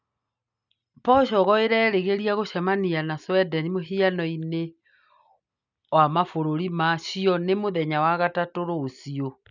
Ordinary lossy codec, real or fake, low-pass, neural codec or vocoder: none; real; 7.2 kHz; none